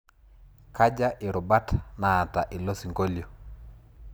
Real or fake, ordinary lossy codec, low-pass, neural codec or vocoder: real; none; none; none